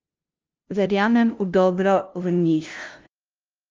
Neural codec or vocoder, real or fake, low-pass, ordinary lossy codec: codec, 16 kHz, 0.5 kbps, FunCodec, trained on LibriTTS, 25 frames a second; fake; 7.2 kHz; Opus, 24 kbps